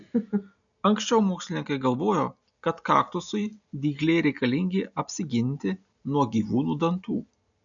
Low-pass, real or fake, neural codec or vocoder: 7.2 kHz; real; none